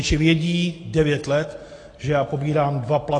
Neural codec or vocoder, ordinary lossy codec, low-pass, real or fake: none; AAC, 32 kbps; 9.9 kHz; real